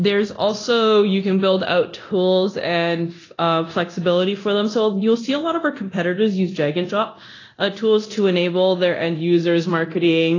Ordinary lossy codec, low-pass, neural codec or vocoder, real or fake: AAC, 32 kbps; 7.2 kHz; codec, 24 kHz, 0.9 kbps, DualCodec; fake